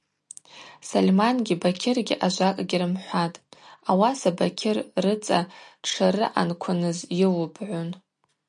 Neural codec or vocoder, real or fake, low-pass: none; real; 10.8 kHz